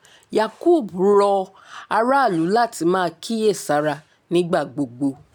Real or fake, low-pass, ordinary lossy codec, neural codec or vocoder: real; 19.8 kHz; none; none